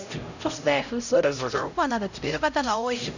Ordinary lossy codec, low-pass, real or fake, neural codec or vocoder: none; 7.2 kHz; fake; codec, 16 kHz, 0.5 kbps, X-Codec, HuBERT features, trained on LibriSpeech